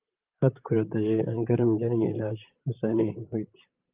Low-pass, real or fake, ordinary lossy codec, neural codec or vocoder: 3.6 kHz; fake; Opus, 32 kbps; vocoder, 44.1 kHz, 128 mel bands, Pupu-Vocoder